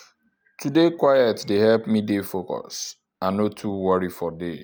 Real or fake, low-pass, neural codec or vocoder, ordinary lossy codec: real; none; none; none